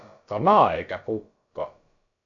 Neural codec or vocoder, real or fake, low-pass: codec, 16 kHz, about 1 kbps, DyCAST, with the encoder's durations; fake; 7.2 kHz